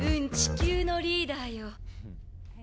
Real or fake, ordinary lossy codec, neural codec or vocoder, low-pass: real; none; none; none